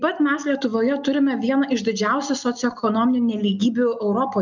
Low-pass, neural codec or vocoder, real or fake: 7.2 kHz; none; real